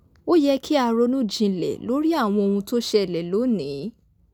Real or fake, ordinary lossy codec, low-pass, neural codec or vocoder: real; none; 19.8 kHz; none